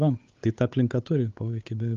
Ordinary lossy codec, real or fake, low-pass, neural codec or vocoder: Opus, 32 kbps; fake; 7.2 kHz; codec, 16 kHz, 8 kbps, FunCodec, trained on Chinese and English, 25 frames a second